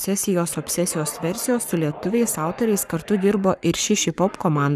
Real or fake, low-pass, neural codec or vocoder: fake; 14.4 kHz; codec, 44.1 kHz, 7.8 kbps, Pupu-Codec